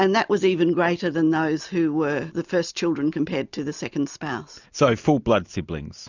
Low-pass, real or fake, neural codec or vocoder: 7.2 kHz; real; none